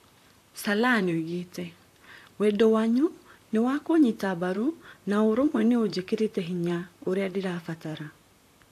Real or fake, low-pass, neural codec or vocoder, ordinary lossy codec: fake; 14.4 kHz; vocoder, 44.1 kHz, 128 mel bands, Pupu-Vocoder; AAC, 64 kbps